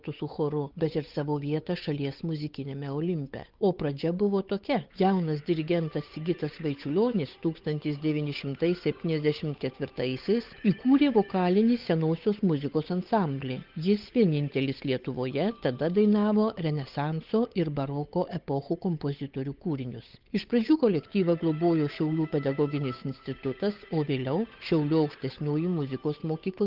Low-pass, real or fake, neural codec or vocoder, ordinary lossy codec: 5.4 kHz; fake; codec, 16 kHz, 8 kbps, FunCodec, trained on Chinese and English, 25 frames a second; Opus, 32 kbps